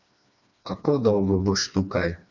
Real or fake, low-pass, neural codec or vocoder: fake; 7.2 kHz; codec, 16 kHz, 2 kbps, FreqCodec, smaller model